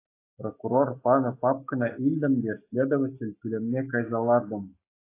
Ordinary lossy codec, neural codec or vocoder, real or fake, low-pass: AAC, 24 kbps; codec, 44.1 kHz, 7.8 kbps, Pupu-Codec; fake; 3.6 kHz